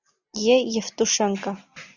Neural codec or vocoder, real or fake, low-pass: none; real; 7.2 kHz